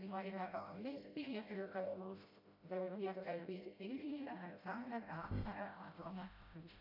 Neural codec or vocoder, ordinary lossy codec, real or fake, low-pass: codec, 16 kHz, 0.5 kbps, FreqCodec, smaller model; AAC, 48 kbps; fake; 5.4 kHz